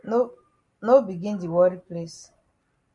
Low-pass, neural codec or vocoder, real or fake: 10.8 kHz; none; real